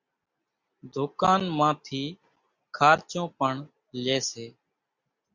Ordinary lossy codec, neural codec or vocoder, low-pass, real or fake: Opus, 64 kbps; none; 7.2 kHz; real